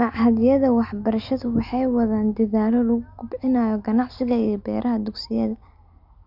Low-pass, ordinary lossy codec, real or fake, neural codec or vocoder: 5.4 kHz; Opus, 64 kbps; real; none